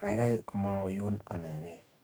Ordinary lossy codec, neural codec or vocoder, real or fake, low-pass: none; codec, 44.1 kHz, 2.6 kbps, DAC; fake; none